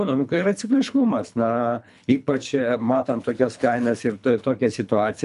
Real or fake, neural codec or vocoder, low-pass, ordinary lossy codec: fake; codec, 24 kHz, 3 kbps, HILCodec; 10.8 kHz; MP3, 96 kbps